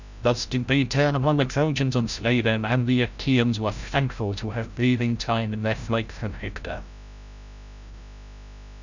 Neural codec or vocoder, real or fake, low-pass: codec, 16 kHz, 0.5 kbps, FreqCodec, larger model; fake; 7.2 kHz